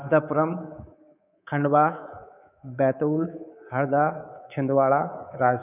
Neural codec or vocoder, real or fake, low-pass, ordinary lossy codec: codec, 24 kHz, 3.1 kbps, DualCodec; fake; 3.6 kHz; none